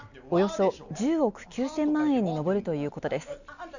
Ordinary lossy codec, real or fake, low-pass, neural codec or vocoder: none; real; 7.2 kHz; none